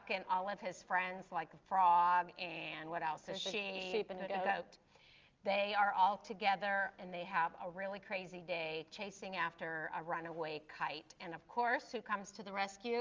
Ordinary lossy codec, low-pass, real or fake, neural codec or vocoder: Opus, 32 kbps; 7.2 kHz; real; none